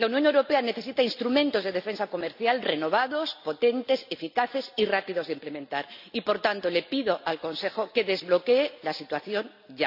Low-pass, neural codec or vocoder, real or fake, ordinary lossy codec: 5.4 kHz; none; real; none